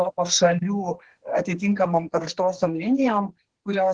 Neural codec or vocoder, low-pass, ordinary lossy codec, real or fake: codec, 32 kHz, 1.9 kbps, SNAC; 9.9 kHz; Opus, 16 kbps; fake